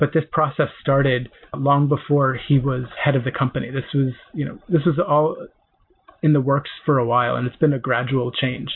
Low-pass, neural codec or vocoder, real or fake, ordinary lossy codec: 5.4 kHz; none; real; MP3, 32 kbps